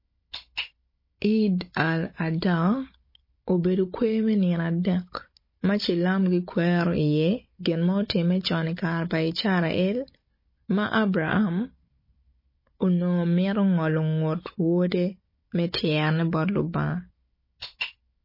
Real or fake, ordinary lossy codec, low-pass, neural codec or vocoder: fake; MP3, 24 kbps; 5.4 kHz; codec, 16 kHz, 16 kbps, FunCodec, trained on Chinese and English, 50 frames a second